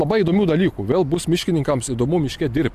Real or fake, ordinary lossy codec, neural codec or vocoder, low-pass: real; AAC, 96 kbps; none; 14.4 kHz